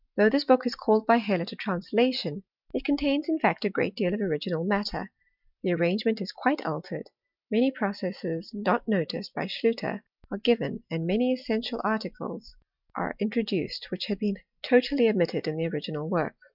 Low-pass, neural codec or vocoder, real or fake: 5.4 kHz; none; real